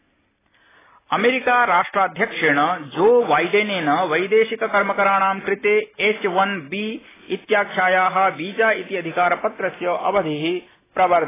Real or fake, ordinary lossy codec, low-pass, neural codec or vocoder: real; AAC, 16 kbps; 3.6 kHz; none